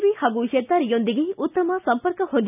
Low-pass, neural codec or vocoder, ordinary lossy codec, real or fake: 3.6 kHz; none; none; real